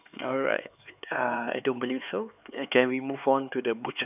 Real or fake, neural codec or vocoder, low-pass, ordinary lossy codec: fake; codec, 16 kHz, 4 kbps, X-Codec, HuBERT features, trained on LibriSpeech; 3.6 kHz; none